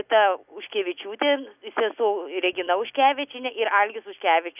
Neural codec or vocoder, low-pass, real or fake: none; 3.6 kHz; real